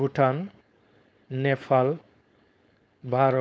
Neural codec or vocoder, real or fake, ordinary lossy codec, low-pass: codec, 16 kHz, 4.8 kbps, FACodec; fake; none; none